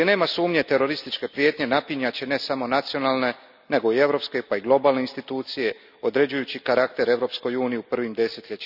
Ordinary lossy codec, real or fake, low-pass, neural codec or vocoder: none; real; 5.4 kHz; none